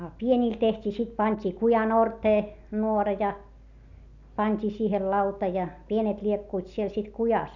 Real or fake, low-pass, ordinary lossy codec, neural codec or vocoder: real; 7.2 kHz; none; none